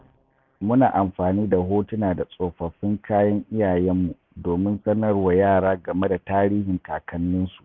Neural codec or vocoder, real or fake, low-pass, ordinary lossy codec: none; real; 7.2 kHz; none